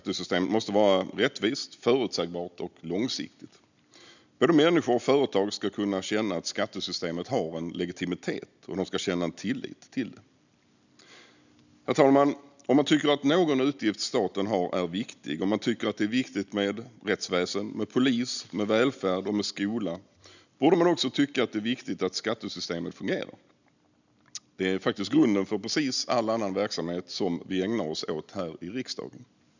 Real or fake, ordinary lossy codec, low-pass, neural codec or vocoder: real; none; 7.2 kHz; none